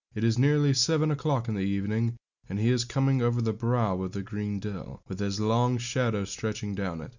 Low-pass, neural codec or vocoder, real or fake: 7.2 kHz; none; real